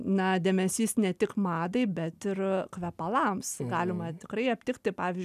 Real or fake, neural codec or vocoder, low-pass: real; none; 14.4 kHz